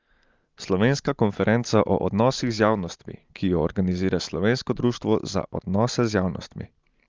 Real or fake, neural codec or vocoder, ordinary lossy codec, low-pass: real; none; Opus, 32 kbps; 7.2 kHz